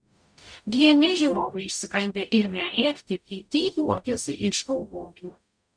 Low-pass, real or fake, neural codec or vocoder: 9.9 kHz; fake; codec, 44.1 kHz, 0.9 kbps, DAC